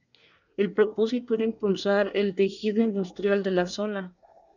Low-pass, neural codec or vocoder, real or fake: 7.2 kHz; codec, 24 kHz, 1 kbps, SNAC; fake